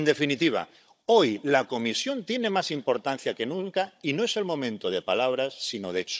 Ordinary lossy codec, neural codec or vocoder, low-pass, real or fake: none; codec, 16 kHz, 16 kbps, FunCodec, trained on Chinese and English, 50 frames a second; none; fake